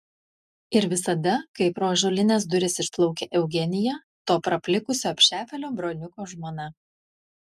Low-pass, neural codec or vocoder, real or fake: 14.4 kHz; none; real